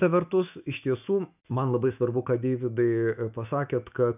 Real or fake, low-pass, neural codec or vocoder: fake; 3.6 kHz; autoencoder, 48 kHz, 128 numbers a frame, DAC-VAE, trained on Japanese speech